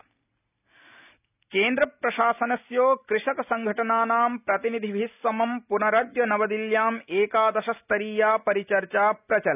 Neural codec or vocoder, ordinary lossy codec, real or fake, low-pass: none; none; real; 3.6 kHz